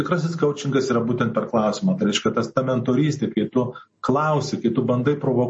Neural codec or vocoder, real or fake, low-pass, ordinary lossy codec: none; real; 9.9 kHz; MP3, 32 kbps